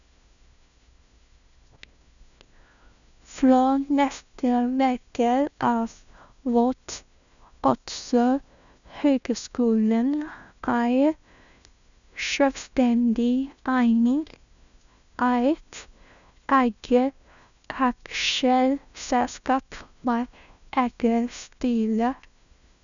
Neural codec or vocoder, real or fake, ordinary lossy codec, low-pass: codec, 16 kHz, 1 kbps, FunCodec, trained on LibriTTS, 50 frames a second; fake; none; 7.2 kHz